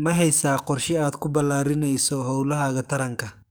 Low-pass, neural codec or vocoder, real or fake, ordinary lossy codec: none; codec, 44.1 kHz, 7.8 kbps, DAC; fake; none